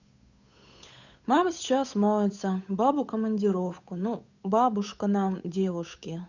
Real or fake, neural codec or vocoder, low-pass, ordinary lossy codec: fake; codec, 16 kHz, 8 kbps, FunCodec, trained on Chinese and English, 25 frames a second; 7.2 kHz; none